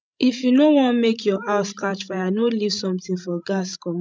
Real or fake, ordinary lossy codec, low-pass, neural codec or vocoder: fake; none; none; codec, 16 kHz, 16 kbps, FreqCodec, larger model